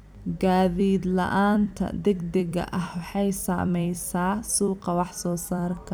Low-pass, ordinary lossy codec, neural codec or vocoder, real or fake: none; none; vocoder, 44.1 kHz, 128 mel bands every 256 samples, BigVGAN v2; fake